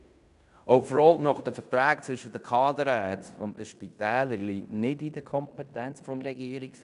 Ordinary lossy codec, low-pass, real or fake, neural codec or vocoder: none; 10.8 kHz; fake; codec, 16 kHz in and 24 kHz out, 0.9 kbps, LongCat-Audio-Codec, fine tuned four codebook decoder